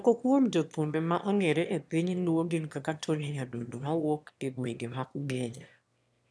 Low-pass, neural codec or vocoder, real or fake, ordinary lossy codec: none; autoencoder, 22.05 kHz, a latent of 192 numbers a frame, VITS, trained on one speaker; fake; none